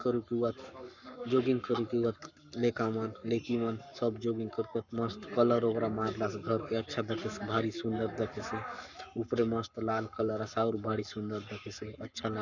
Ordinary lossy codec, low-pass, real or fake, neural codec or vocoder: none; 7.2 kHz; fake; codec, 44.1 kHz, 7.8 kbps, Pupu-Codec